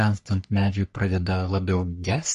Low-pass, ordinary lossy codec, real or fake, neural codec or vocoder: 14.4 kHz; MP3, 48 kbps; fake; codec, 44.1 kHz, 3.4 kbps, Pupu-Codec